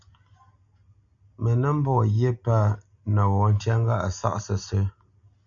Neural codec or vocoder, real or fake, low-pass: none; real; 7.2 kHz